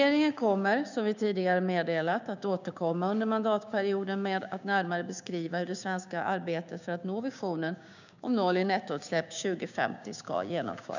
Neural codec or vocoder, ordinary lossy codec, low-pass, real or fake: codec, 16 kHz, 6 kbps, DAC; none; 7.2 kHz; fake